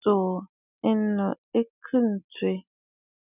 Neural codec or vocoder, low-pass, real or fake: none; 3.6 kHz; real